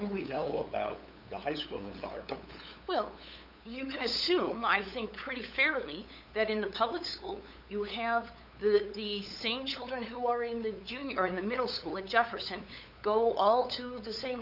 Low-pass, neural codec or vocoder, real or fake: 5.4 kHz; codec, 16 kHz, 8 kbps, FunCodec, trained on LibriTTS, 25 frames a second; fake